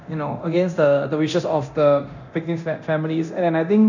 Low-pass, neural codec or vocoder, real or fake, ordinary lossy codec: 7.2 kHz; codec, 24 kHz, 0.9 kbps, DualCodec; fake; none